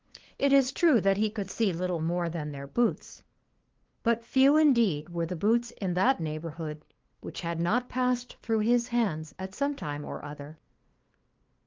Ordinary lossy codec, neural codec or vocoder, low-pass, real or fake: Opus, 16 kbps; codec, 16 kHz, 2 kbps, FunCodec, trained on LibriTTS, 25 frames a second; 7.2 kHz; fake